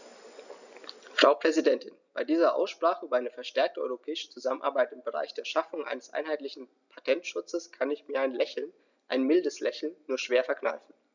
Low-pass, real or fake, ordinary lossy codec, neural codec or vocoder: 7.2 kHz; real; none; none